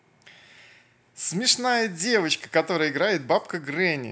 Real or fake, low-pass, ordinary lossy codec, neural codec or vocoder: real; none; none; none